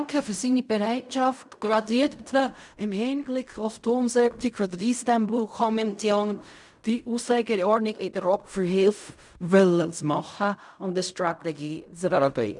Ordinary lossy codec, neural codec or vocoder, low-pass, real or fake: none; codec, 16 kHz in and 24 kHz out, 0.4 kbps, LongCat-Audio-Codec, fine tuned four codebook decoder; 10.8 kHz; fake